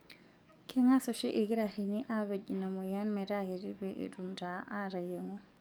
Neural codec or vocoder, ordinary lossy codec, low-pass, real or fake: codec, 44.1 kHz, 7.8 kbps, DAC; none; none; fake